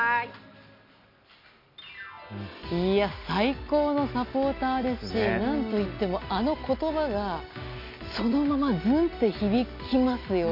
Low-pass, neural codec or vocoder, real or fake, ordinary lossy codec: 5.4 kHz; none; real; MP3, 48 kbps